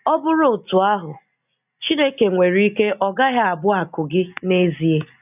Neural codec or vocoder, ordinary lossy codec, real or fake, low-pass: none; none; real; 3.6 kHz